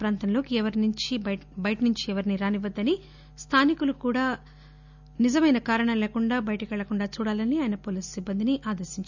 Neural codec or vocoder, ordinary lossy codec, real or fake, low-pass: none; none; real; 7.2 kHz